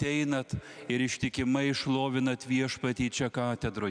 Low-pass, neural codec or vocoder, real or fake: 9.9 kHz; none; real